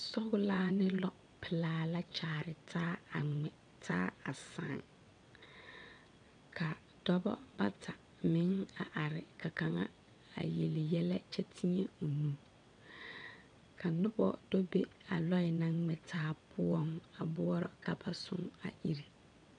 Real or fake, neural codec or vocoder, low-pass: fake; vocoder, 22.05 kHz, 80 mel bands, WaveNeXt; 9.9 kHz